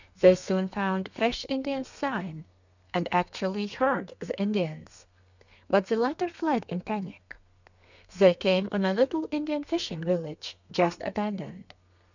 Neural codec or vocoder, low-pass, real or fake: codec, 32 kHz, 1.9 kbps, SNAC; 7.2 kHz; fake